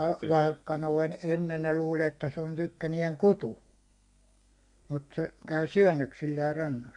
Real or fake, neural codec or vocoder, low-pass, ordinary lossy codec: fake; codec, 32 kHz, 1.9 kbps, SNAC; 10.8 kHz; none